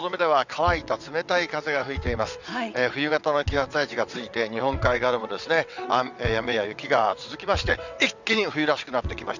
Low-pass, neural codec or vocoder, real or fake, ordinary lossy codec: 7.2 kHz; vocoder, 22.05 kHz, 80 mel bands, WaveNeXt; fake; none